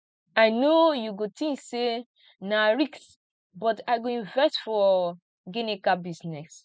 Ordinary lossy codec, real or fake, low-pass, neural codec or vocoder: none; real; none; none